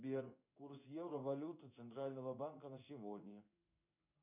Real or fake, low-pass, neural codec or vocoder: fake; 3.6 kHz; codec, 16 kHz in and 24 kHz out, 1 kbps, XY-Tokenizer